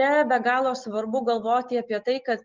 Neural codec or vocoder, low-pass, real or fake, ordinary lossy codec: none; 7.2 kHz; real; Opus, 24 kbps